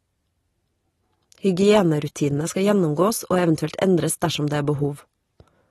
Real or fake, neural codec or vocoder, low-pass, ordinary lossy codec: real; none; 19.8 kHz; AAC, 32 kbps